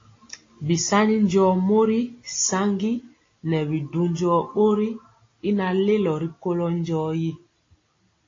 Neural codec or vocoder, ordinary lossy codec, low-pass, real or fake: none; AAC, 32 kbps; 7.2 kHz; real